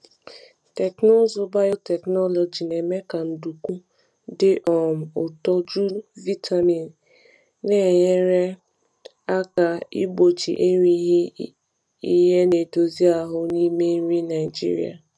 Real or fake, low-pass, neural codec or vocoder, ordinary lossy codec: real; none; none; none